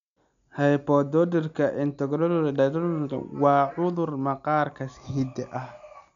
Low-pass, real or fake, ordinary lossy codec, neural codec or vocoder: 7.2 kHz; real; none; none